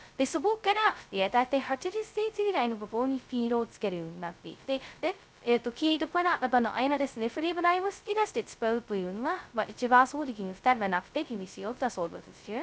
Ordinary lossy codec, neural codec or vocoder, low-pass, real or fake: none; codec, 16 kHz, 0.2 kbps, FocalCodec; none; fake